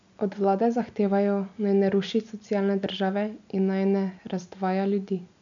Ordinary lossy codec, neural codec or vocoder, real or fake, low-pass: MP3, 96 kbps; none; real; 7.2 kHz